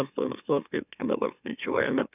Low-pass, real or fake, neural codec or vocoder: 3.6 kHz; fake; autoencoder, 44.1 kHz, a latent of 192 numbers a frame, MeloTTS